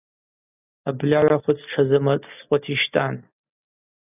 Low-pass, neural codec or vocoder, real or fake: 3.6 kHz; none; real